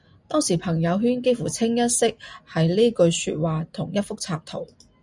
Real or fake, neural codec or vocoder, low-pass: real; none; 10.8 kHz